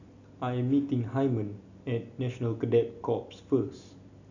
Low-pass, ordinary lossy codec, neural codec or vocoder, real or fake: 7.2 kHz; none; none; real